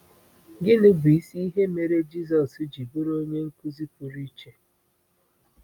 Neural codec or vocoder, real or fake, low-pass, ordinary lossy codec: none; real; 19.8 kHz; none